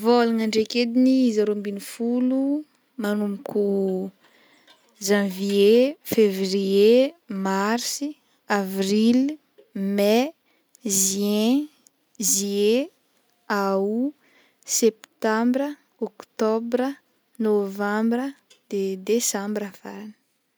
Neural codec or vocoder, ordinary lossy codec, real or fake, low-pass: none; none; real; none